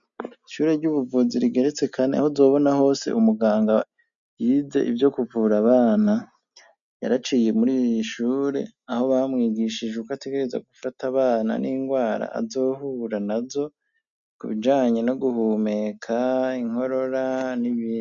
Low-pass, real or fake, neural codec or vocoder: 7.2 kHz; real; none